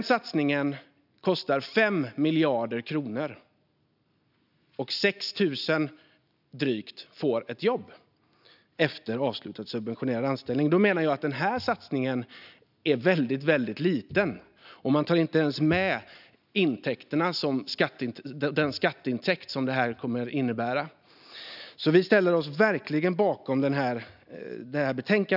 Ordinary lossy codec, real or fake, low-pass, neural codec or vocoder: none; real; 5.4 kHz; none